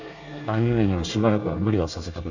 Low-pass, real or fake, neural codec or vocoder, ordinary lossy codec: 7.2 kHz; fake; codec, 24 kHz, 1 kbps, SNAC; none